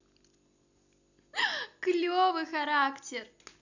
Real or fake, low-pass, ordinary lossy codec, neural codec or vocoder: real; 7.2 kHz; none; none